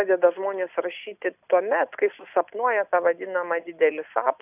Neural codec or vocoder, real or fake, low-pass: none; real; 3.6 kHz